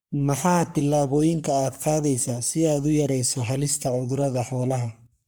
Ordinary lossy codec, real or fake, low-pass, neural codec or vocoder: none; fake; none; codec, 44.1 kHz, 3.4 kbps, Pupu-Codec